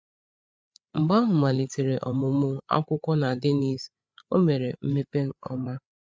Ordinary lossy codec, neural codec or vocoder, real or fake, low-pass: none; codec, 16 kHz, 8 kbps, FreqCodec, larger model; fake; none